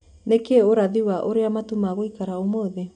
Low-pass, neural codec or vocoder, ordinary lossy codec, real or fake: 9.9 kHz; none; none; real